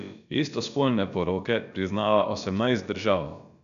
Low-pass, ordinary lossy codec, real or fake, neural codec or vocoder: 7.2 kHz; none; fake; codec, 16 kHz, about 1 kbps, DyCAST, with the encoder's durations